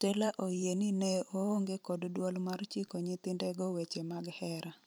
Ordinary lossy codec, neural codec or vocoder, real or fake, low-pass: none; vocoder, 44.1 kHz, 128 mel bands every 512 samples, BigVGAN v2; fake; none